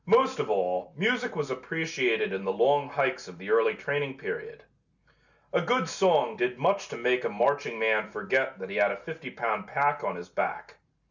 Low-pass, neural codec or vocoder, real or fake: 7.2 kHz; none; real